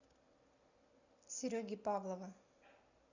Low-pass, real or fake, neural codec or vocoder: 7.2 kHz; real; none